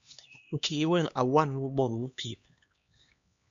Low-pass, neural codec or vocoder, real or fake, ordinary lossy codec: 7.2 kHz; codec, 16 kHz, 2 kbps, X-Codec, HuBERT features, trained on LibriSpeech; fake; MP3, 64 kbps